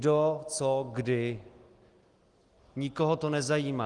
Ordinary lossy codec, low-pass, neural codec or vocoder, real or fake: Opus, 24 kbps; 10.8 kHz; none; real